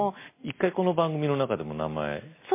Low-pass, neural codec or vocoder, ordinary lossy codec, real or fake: 3.6 kHz; none; MP3, 24 kbps; real